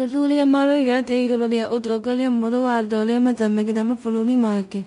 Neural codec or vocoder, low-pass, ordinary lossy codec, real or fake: codec, 16 kHz in and 24 kHz out, 0.4 kbps, LongCat-Audio-Codec, two codebook decoder; 10.8 kHz; MP3, 64 kbps; fake